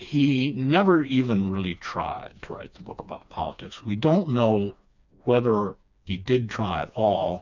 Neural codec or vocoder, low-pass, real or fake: codec, 16 kHz, 2 kbps, FreqCodec, smaller model; 7.2 kHz; fake